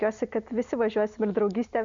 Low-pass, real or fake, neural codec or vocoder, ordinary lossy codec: 7.2 kHz; real; none; MP3, 64 kbps